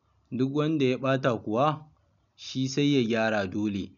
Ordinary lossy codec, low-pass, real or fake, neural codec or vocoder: none; 7.2 kHz; real; none